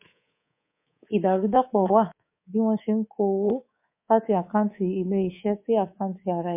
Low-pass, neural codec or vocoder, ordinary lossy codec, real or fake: 3.6 kHz; codec, 24 kHz, 3.1 kbps, DualCodec; MP3, 24 kbps; fake